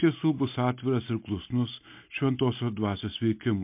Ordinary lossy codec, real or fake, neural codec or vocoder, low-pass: MP3, 32 kbps; real; none; 3.6 kHz